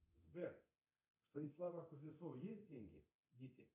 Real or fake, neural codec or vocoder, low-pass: fake; codec, 24 kHz, 0.9 kbps, DualCodec; 3.6 kHz